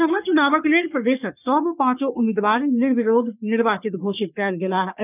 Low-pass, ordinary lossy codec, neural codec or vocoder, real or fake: 3.6 kHz; none; codec, 44.1 kHz, 3.4 kbps, Pupu-Codec; fake